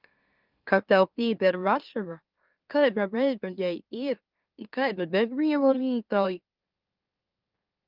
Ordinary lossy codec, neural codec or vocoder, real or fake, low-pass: Opus, 24 kbps; autoencoder, 44.1 kHz, a latent of 192 numbers a frame, MeloTTS; fake; 5.4 kHz